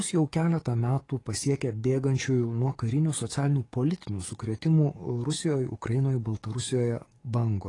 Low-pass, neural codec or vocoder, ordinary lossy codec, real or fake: 10.8 kHz; codec, 44.1 kHz, 7.8 kbps, DAC; AAC, 32 kbps; fake